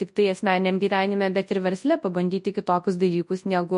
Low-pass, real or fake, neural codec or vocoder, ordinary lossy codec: 10.8 kHz; fake; codec, 24 kHz, 0.9 kbps, WavTokenizer, large speech release; MP3, 48 kbps